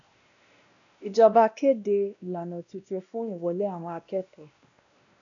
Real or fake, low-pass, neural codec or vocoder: fake; 7.2 kHz; codec, 16 kHz, 1 kbps, X-Codec, WavLM features, trained on Multilingual LibriSpeech